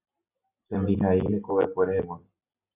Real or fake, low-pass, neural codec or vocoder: real; 3.6 kHz; none